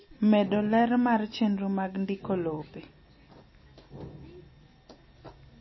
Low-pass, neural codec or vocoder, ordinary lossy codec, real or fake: 7.2 kHz; none; MP3, 24 kbps; real